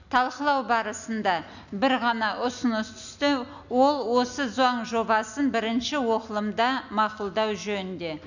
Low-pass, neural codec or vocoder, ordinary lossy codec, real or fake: 7.2 kHz; none; none; real